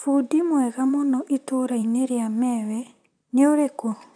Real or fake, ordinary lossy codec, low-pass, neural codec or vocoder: fake; none; 9.9 kHz; vocoder, 44.1 kHz, 128 mel bands, Pupu-Vocoder